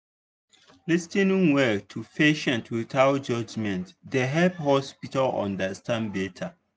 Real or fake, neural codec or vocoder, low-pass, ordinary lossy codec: real; none; none; none